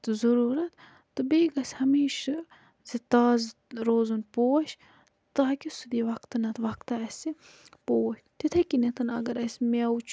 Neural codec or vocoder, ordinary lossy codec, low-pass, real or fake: none; none; none; real